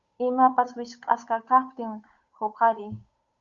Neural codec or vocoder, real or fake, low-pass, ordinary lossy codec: codec, 16 kHz, 8 kbps, FunCodec, trained on Chinese and English, 25 frames a second; fake; 7.2 kHz; Opus, 64 kbps